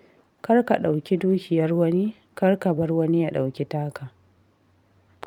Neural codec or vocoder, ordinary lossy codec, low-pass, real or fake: vocoder, 44.1 kHz, 128 mel bands every 512 samples, BigVGAN v2; none; 19.8 kHz; fake